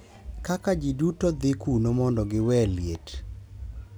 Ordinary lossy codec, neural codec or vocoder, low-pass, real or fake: none; none; none; real